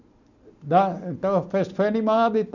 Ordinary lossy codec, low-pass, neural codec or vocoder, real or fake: none; 7.2 kHz; none; real